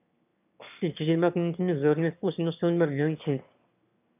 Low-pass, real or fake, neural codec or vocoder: 3.6 kHz; fake; autoencoder, 22.05 kHz, a latent of 192 numbers a frame, VITS, trained on one speaker